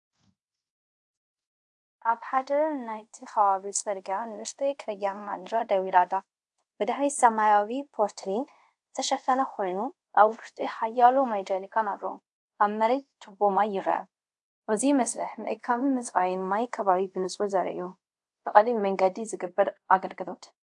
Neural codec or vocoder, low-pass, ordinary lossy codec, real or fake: codec, 24 kHz, 0.5 kbps, DualCodec; 10.8 kHz; AAC, 64 kbps; fake